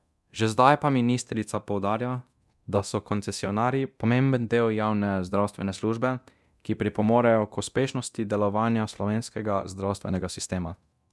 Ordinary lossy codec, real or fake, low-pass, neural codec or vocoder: none; fake; none; codec, 24 kHz, 0.9 kbps, DualCodec